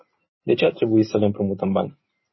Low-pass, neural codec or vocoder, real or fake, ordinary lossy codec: 7.2 kHz; none; real; MP3, 24 kbps